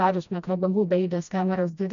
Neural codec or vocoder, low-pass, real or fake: codec, 16 kHz, 1 kbps, FreqCodec, smaller model; 7.2 kHz; fake